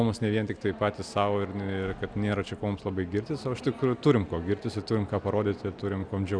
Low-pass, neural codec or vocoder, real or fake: 9.9 kHz; none; real